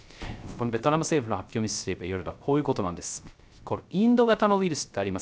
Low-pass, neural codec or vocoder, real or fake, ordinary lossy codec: none; codec, 16 kHz, 0.3 kbps, FocalCodec; fake; none